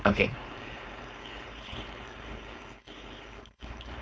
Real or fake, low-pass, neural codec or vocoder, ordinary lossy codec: fake; none; codec, 16 kHz, 4.8 kbps, FACodec; none